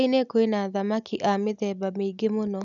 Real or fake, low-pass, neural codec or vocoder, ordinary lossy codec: real; 7.2 kHz; none; none